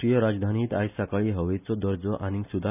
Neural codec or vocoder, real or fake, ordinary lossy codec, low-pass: none; real; none; 3.6 kHz